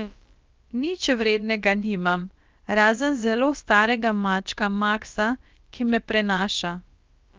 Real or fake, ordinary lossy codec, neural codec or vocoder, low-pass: fake; Opus, 24 kbps; codec, 16 kHz, about 1 kbps, DyCAST, with the encoder's durations; 7.2 kHz